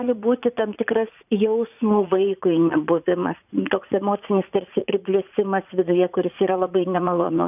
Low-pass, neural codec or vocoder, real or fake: 3.6 kHz; vocoder, 44.1 kHz, 80 mel bands, Vocos; fake